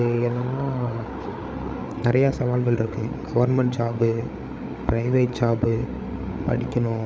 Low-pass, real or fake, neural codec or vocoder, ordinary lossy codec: none; fake; codec, 16 kHz, 8 kbps, FreqCodec, larger model; none